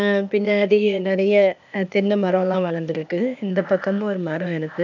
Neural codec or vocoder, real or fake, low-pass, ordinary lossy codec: codec, 16 kHz, 0.8 kbps, ZipCodec; fake; 7.2 kHz; none